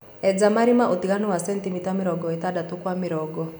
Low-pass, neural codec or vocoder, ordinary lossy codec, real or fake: none; none; none; real